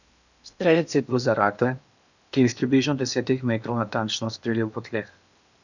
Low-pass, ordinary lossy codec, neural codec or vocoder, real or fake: 7.2 kHz; none; codec, 16 kHz in and 24 kHz out, 0.8 kbps, FocalCodec, streaming, 65536 codes; fake